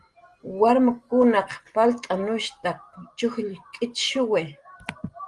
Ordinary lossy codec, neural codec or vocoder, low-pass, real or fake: Opus, 24 kbps; none; 10.8 kHz; real